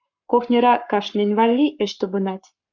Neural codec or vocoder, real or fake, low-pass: codec, 44.1 kHz, 7.8 kbps, Pupu-Codec; fake; 7.2 kHz